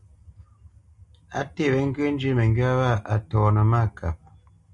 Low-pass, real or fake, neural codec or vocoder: 10.8 kHz; real; none